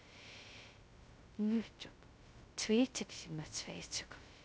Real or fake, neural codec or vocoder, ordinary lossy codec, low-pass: fake; codec, 16 kHz, 0.2 kbps, FocalCodec; none; none